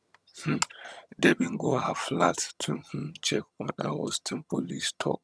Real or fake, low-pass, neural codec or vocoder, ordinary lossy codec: fake; none; vocoder, 22.05 kHz, 80 mel bands, HiFi-GAN; none